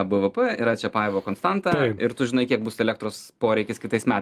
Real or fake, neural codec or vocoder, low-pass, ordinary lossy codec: real; none; 14.4 kHz; Opus, 32 kbps